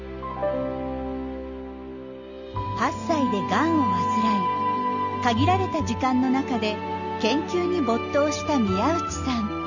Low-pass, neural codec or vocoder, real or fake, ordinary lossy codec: 7.2 kHz; none; real; none